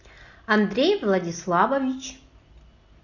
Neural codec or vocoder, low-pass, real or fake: none; 7.2 kHz; real